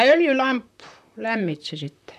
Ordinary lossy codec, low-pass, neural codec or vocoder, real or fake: none; 14.4 kHz; vocoder, 44.1 kHz, 128 mel bands, Pupu-Vocoder; fake